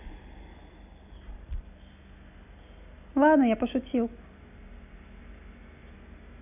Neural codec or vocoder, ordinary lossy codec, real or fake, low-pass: none; none; real; 3.6 kHz